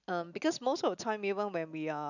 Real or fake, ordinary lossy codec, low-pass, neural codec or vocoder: real; none; 7.2 kHz; none